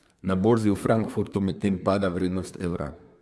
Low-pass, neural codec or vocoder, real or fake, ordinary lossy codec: none; codec, 24 kHz, 1 kbps, SNAC; fake; none